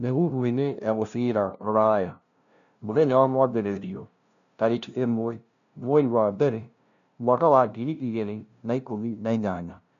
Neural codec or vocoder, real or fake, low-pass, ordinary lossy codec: codec, 16 kHz, 0.5 kbps, FunCodec, trained on LibriTTS, 25 frames a second; fake; 7.2 kHz; none